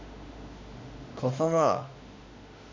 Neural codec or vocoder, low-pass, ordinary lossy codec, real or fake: autoencoder, 48 kHz, 32 numbers a frame, DAC-VAE, trained on Japanese speech; 7.2 kHz; MP3, 48 kbps; fake